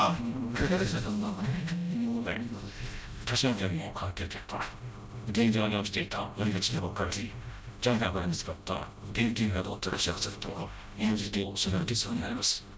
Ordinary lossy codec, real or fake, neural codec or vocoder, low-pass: none; fake; codec, 16 kHz, 0.5 kbps, FreqCodec, smaller model; none